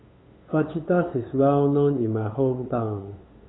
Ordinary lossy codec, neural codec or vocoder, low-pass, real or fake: AAC, 16 kbps; codec, 16 kHz, 8 kbps, FunCodec, trained on LibriTTS, 25 frames a second; 7.2 kHz; fake